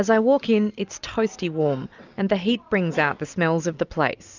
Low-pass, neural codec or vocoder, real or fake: 7.2 kHz; none; real